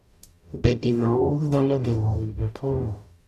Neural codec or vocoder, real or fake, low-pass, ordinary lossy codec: codec, 44.1 kHz, 0.9 kbps, DAC; fake; 14.4 kHz; none